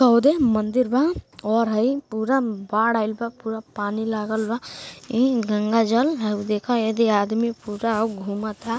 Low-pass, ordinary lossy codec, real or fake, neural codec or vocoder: none; none; real; none